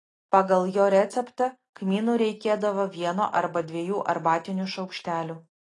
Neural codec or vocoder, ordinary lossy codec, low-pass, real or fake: none; AAC, 32 kbps; 10.8 kHz; real